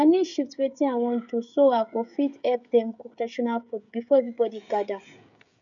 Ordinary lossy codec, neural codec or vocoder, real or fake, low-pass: none; codec, 16 kHz, 16 kbps, FreqCodec, smaller model; fake; 7.2 kHz